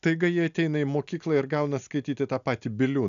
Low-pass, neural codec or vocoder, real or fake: 7.2 kHz; none; real